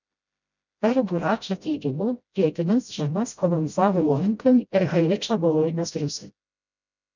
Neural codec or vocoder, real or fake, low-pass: codec, 16 kHz, 0.5 kbps, FreqCodec, smaller model; fake; 7.2 kHz